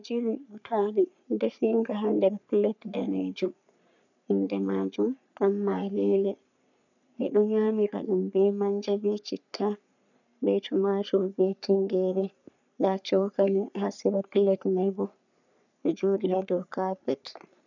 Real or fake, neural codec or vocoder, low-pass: fake; codec, 44.1 kHz, 3.4 kbps, Pupu-Codec; 7.2 kHz